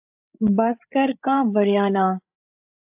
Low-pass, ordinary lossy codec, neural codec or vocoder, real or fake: 3.6 kHz; AAC, 32 kbps; codec, 16 kHz, 8 kbps, FreqCodec, larger model; fake